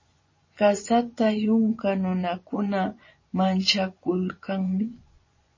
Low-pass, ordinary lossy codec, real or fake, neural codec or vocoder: 7.2 kHz; MP3, 32 kbps; fake; vocoder, 24 kHz, 100 mel bands, Vocos